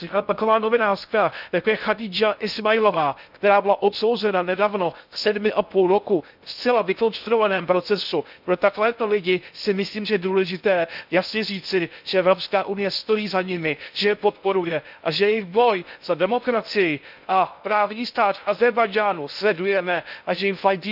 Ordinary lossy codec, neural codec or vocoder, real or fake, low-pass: none; codec, 16 kHz in and 24 kHz out, 0.6 kbps, FocalCodec, streaming, 2048 codes; fake; 5.4 kHz